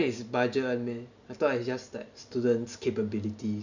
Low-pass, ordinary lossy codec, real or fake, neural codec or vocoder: 7.2 kHz; none; real; none